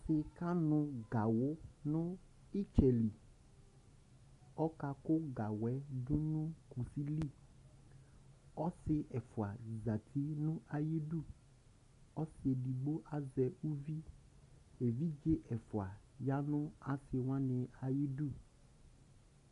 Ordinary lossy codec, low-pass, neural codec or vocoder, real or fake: AAC, 64 kbps; 10.8 kHz; none; real